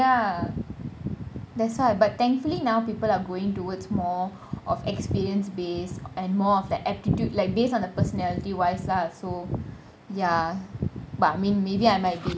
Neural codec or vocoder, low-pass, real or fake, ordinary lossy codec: none; none; real; none